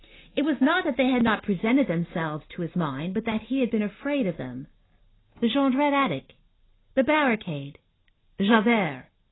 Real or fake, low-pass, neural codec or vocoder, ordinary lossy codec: real; 7.2 kHz; none; AAC, 16 kbps